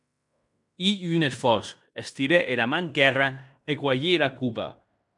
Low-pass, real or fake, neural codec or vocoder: 10.8 kHz; fake; codec, 16 kHz in and 24 kHz out, 0.9 kbps, LongCat-Audio-Codec, fine tuned four codebook decoder